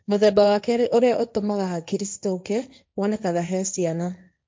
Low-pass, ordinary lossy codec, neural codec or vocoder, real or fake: none; none; codec, 16 kHz, 1.1 kbps, Voila-Tokenizer; fake